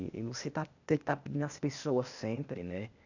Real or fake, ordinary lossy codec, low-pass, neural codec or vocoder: fake; none; 7.2 kHz; codec, 16 kHz, 0.8 kbps, ZipCodec